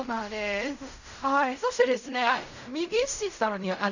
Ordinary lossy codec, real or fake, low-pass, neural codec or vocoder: none; fake; 7.2 kHz; codec, 16 kHz in and 24 kHz out, 0.4 kbps, LongCat-Audio-Codec, fine tuned four codebook decoder